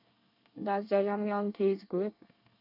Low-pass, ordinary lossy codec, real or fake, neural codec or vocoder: 5.4 kHz; none; fake; codec, 24 kHz, 1 kbps, SNAC